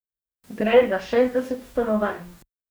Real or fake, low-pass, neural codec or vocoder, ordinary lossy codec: fake; none; codec, 44.1 kHz, 2.6 kbps, DAC; none